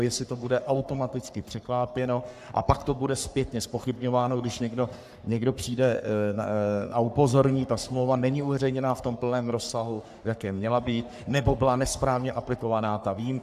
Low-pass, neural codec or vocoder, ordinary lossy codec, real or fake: 14.4 kHz; codec, 44.1 kHz, 3.4 kbps, Pupu-Codec; AAC, 96 kbps; fake